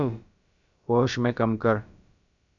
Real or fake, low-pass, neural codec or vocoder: fake; 7.2 kHz; codec, 16 kHz, about 1 kbps, DyCAST, with the encoder's durations